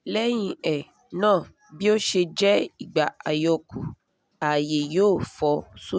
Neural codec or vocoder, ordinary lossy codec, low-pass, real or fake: none; none; none; real